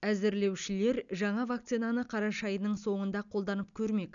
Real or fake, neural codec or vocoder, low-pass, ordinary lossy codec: real; none; 7.2 kHz; none